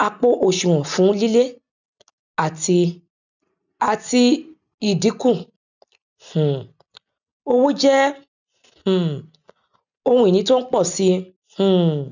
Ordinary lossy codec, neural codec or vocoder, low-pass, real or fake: none; none; 7.2 kHz; real